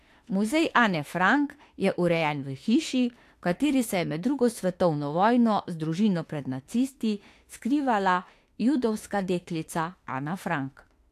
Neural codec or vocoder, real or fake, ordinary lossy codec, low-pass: autoencoder, 48 kHz, 32 numbers a frame, DAC-VAE, trained on Japanese speech; fake; AAC, 64 kbps; 14.4 kHz